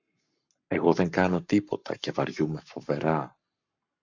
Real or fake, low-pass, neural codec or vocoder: fake; 7.2 kHz; codec, 44.1 kHz, 7.8 kbps, Pupu-Codec